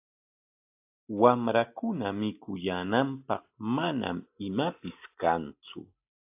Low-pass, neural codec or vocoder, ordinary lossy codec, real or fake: 3.6 kHz; none; AAC, 32 kbps; real